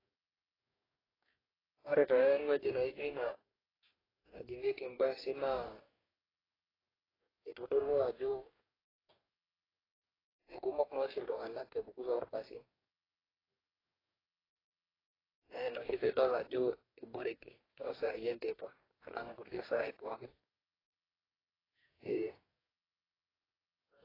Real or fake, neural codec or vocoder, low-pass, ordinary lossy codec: fake; codec, 44.1 kHz, 2.6 kbps, DAC; 5.4 kHz; AAC, 24 kbps